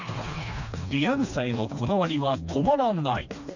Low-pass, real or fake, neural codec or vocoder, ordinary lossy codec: 7.2 kHz; fake; codec, 16 kHz, 2 kbps, FreqCodec, smaller model; none